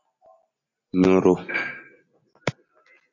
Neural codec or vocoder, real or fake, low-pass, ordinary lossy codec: none; real; 7.2 kHz; MP3, 48 kbps